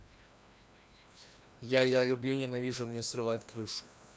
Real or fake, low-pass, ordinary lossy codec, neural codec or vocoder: fake; none; none; codec, 16 kHz, 1 kbps, FreqCodec, larger model